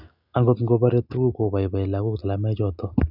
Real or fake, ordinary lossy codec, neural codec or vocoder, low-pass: real; none; none; 5.4 kHz